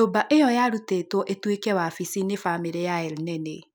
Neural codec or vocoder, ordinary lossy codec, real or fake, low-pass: none; none; real; none